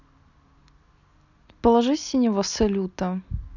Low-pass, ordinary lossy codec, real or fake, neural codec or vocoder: 7.2 kHz; none; real; none